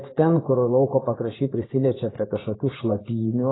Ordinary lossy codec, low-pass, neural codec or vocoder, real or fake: AAC, 16 kbps; 7.2 kHz; vocoder, 24 kHz, 100 mel bands, Vocos; fake